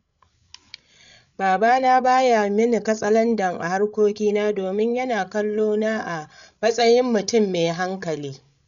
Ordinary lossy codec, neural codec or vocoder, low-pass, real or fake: none; codec, 16 kHz, 8 kbps, FreqCodec, larger model; 7.2 kHz; fake